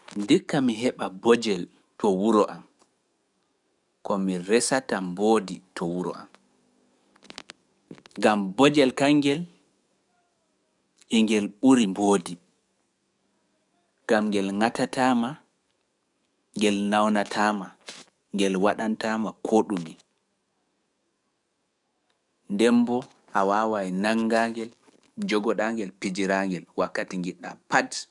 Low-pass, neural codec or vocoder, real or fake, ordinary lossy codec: 10.8 kHz; codec, 44.1 kHz, 7.8 kbps, DAC; fake; none